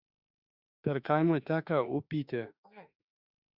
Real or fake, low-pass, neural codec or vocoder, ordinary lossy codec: fake; 5.4 kHz; autoencoder, 48 kHz, 32 numbers a frame, DAC-VAE, trained on Japanese speech; Opus, 64 kbps